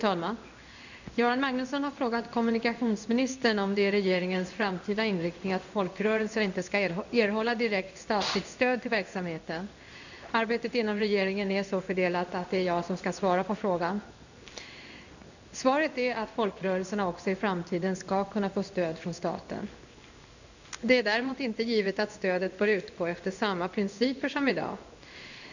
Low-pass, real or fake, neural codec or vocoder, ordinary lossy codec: 7.2 kHz; fake; codec, 16 kHz in and 24 kHz out, 1 kbps, XY-Tokenizer; none